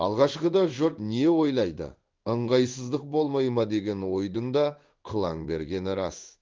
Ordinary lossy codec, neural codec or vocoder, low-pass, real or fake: Opus, 32 kbps; codec, 16 kHz in and 24 kHz out, 1 kbps, XY-Tokenizer; 7.2 kHz; fake